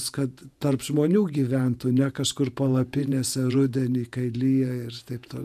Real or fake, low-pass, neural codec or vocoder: fake; 14.4 kHz; vocoder, 48 kHz, 128 mel bands, Vocos